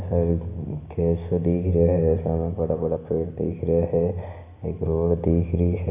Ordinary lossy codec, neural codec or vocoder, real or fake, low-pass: none; none; real; 3.6 kHz